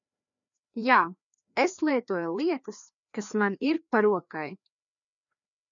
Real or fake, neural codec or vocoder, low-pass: fake; codec, 16 kHz, 2 kbps, FreqCodec, larger model; 7.2 kHz